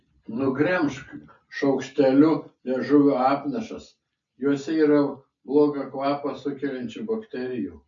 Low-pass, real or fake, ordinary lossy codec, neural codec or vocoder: 7.2 kHz; real; MP3, 64 kbps; none